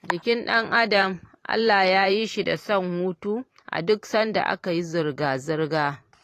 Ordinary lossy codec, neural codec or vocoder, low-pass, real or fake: AAC, 48 kbps; vocoder, 44.1 kHz, 128 mel bands every 512 samples, BigVGAN v2; 14.4 kHz; fake